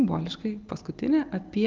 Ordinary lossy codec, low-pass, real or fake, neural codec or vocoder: Opus, 24 kbps; 7.2 kHz; real; none